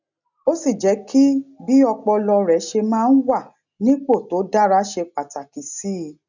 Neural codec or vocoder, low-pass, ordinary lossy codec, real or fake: none; 7.2 kHz; none; real